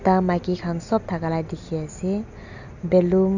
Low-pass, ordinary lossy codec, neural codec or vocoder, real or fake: 7.2 kHz; none; none; real